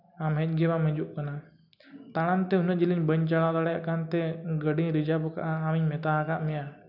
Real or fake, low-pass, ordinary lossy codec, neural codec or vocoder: real; 5.4 kHz; none; none